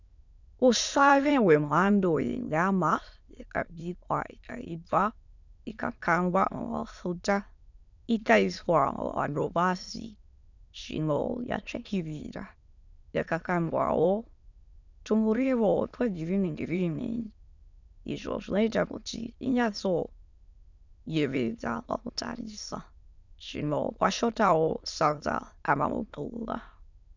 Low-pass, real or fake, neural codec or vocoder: 7.2 kHz; fake; autoencoder, 22.05 kHz, a latent of 192 numbers a frame, VITS, trained on many speakers